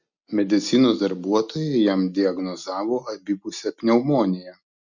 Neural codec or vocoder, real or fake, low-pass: none; real; 7.2 kHz